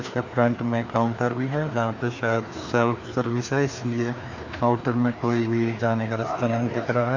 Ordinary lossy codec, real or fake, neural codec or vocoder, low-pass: MP3, 48 kbps; fake; codec, 16 kHz, 2 kbps, FreqCodec, larger model; 7.2 kHz